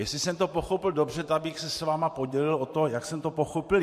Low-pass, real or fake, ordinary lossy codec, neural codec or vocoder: 14.4 kHz; fake; MP3, 64 kbps; vocoder, 44.1 kHz, 128 mel bands every 512 samples, BigVGAN v2